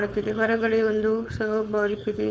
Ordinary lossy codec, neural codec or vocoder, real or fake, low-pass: none; codec, 16 kHz, 4.8 kbps, FACodec; fake; none